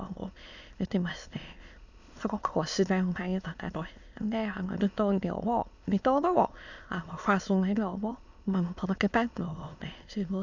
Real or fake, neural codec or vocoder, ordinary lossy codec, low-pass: fake; autoencoder, 22.05 kHz, a latent of 192 numbers a frame, VITS, trained on many speakers; none; 7.2 kHz